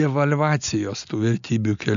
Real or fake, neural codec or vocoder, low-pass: real; none; 7.2 kHz